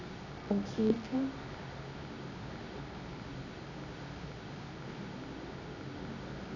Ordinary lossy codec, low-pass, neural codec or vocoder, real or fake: none; 7.2 kHz; codec, 24 kHz, 0.9 kbps, WavTokenizer, medium music audio release; fake